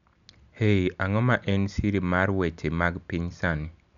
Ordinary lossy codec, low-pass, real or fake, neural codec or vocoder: none; 7.2 kHz; real; none